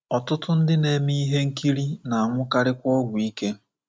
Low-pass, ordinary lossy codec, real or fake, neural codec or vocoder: none; none; real; none